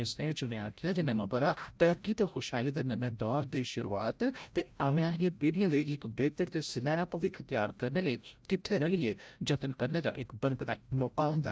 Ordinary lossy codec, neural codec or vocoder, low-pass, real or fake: none; codec, 16 kHz, 0.5 kbps, FreqCodec, larger model; none; fake